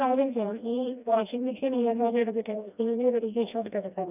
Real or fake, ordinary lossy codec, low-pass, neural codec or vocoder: fake; none; 3.6 kHz; codec, 16 kHz, 1 kbps, FreqCodec, smaller model